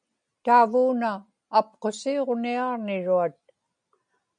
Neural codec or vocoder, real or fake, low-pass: none; real; 9.9 kHz